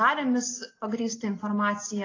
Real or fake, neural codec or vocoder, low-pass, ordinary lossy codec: real; none; 7.2 kHz; AAC, 32 kbps